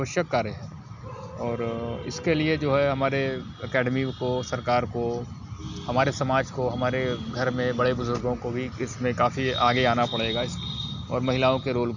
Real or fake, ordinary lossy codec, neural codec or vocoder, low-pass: real; none; none; 7.2 kHz